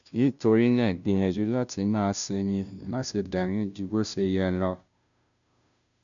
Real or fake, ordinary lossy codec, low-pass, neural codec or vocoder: fake; none; 7.2 kHz; codec, 16 kHz, 0.5 kbps, FunCodec, trained on Chinese and English, 25 frames a second